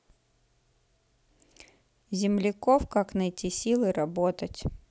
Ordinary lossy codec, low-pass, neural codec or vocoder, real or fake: none; none; none; real